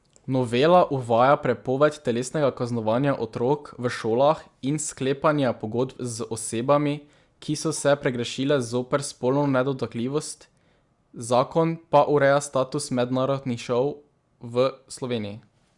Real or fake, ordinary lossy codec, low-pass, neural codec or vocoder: real; Opus, 64 kbps; 10.8 kHz; none